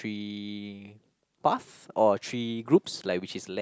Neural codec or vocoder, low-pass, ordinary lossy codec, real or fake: none; none; none; real